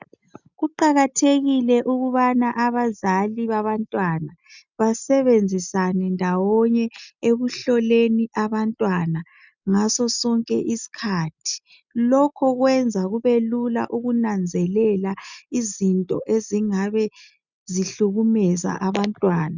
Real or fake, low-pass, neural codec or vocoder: real; 7.2 kHz; none